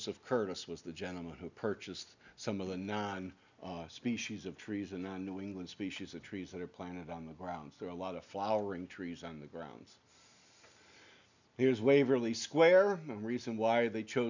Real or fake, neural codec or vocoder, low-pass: real; none; 7.2 kHz